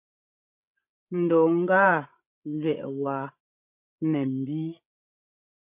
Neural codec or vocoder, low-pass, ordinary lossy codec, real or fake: codec, 16 kHz, 16 kbps, FreqCodec, larger model; 3.6 kHz; MP3, 32 kbps; fake